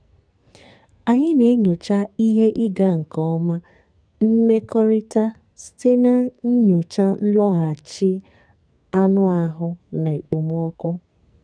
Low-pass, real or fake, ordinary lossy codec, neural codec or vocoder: 9.9 kHz; fake; none; codec, 44.1 kHz, 2.6 kbps, SNAC